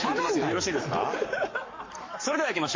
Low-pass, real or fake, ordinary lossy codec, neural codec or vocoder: 7.2 kHz; fake; MP3, 32 kbps; codec, 44.1 kHz, 7.8 kbps, Pupu-Codec